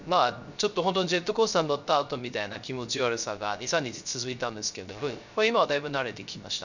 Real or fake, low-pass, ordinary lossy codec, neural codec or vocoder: fake; 7.2 kHz; none; codec, 16 kHz, 0.3 kbps, FocalCodec